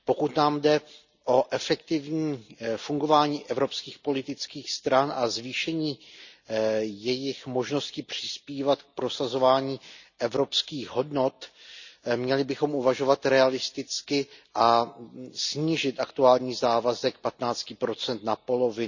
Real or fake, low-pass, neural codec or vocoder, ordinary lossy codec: real; 7.2 kHz; none; none